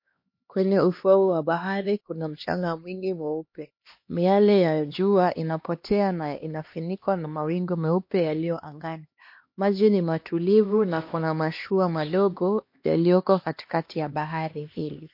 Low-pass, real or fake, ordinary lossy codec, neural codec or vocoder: 5.4 kHz; fake; MP3, 32 kbps; codec, 16 kHz, 2 kbps, X-Codec, HuBERT features, trained on LibriSpeech